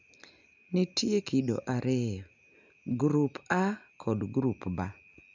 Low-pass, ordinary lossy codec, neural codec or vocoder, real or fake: 7.2 kHz; none; none; real